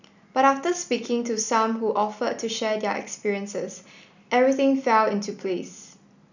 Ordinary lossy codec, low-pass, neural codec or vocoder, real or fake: none; 7.2 kHz; none; real